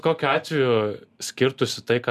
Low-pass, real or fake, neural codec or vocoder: 14.4 kHz; real; none